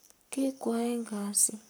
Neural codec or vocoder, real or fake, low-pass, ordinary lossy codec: codec, 44.1 kHz, 7.8 kbps, Pupu-Codec; fake; none; none